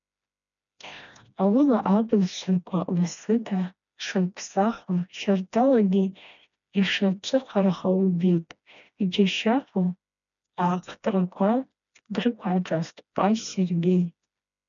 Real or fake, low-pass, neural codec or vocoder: fake; 7.2 kHz; codec, 16 kHz, 1 kbps, FreqCodec, smaller model